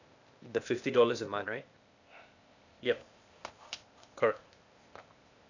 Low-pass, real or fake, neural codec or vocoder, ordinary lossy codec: 7.2 kHz; fake; codec, 16 kHz, 0.8 kbps, ZipCodec; none